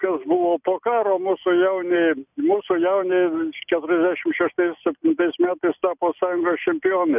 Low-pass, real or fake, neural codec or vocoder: 3.6 kHz; real; none